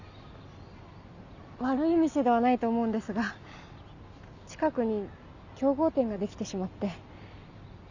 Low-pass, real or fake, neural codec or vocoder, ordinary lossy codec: 7.2 kHz; real; none; Opus, 64 kbps